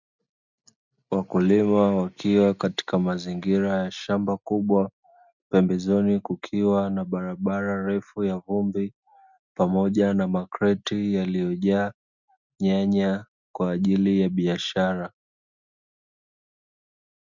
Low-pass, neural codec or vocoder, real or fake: 7.2 kHz; none; real